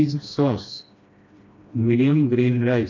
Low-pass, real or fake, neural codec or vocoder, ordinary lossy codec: 7.2 kHz; fake; codec, 16 kHz, 1 kbps, FreqCodec, smaller model; AAC, 48 kbps